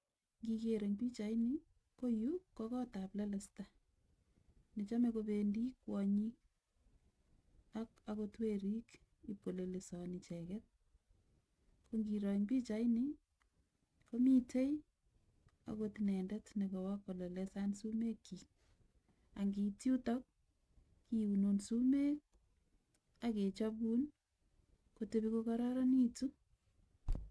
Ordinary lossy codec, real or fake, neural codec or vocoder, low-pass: none; real; none; none